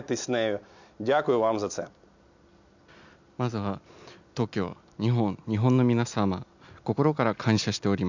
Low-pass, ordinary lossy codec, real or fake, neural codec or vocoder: 7.2 kHz; none; real; none